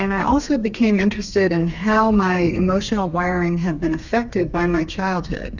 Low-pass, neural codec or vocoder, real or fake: 7.2 kHz; codec, 32 kHz, 1.9 kbps, SNAC; fake